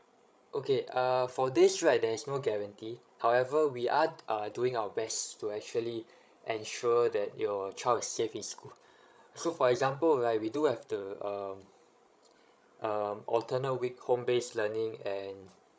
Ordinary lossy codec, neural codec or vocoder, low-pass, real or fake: none; codec, 16 kHz, 16 kbps, FreqCodec, larger model; none; fake